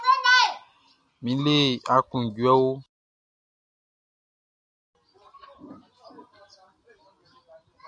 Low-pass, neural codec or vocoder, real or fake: 9.9 kHz; none; real